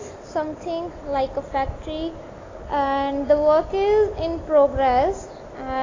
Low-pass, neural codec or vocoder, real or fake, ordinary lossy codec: 7.2 kHz; none; real; AAC, 32 kbps